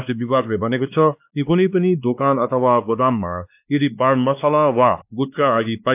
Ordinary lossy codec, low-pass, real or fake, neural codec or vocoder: AAC, 32 kbps; 3.6 kHz; fake; codec, 16 kHz, 2 kbps, X-Codec, WavLM features, trained on Multilingual LibriSpeech